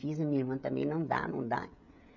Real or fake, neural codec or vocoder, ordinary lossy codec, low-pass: fake; codec, 16 kHz, 16 kbps, FreqCodec, smaller model; none; 7.2 kHz